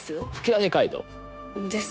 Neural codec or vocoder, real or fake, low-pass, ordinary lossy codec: codec, 16 kHz, 0.9 kbps, LongCat-Audio-Codec; fake; none; none